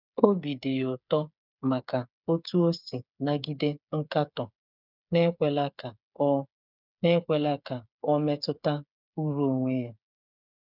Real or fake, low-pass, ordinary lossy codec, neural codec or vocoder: fake; 5.4 kHz; none; codec, 16 kHz, 8 kbps, FreqCodec, smaller model